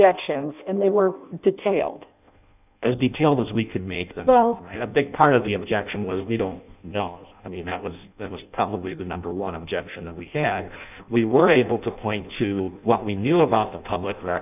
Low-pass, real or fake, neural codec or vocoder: 3.6 kHz; fake; codec, 16 kHz in and 24 kHz out, 0.6 kbps, FireRedTTS-2 codec